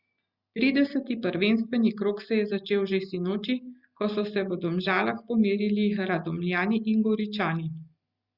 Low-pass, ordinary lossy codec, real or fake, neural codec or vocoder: 5.4 kHz; Opus, 64 kbps; real; none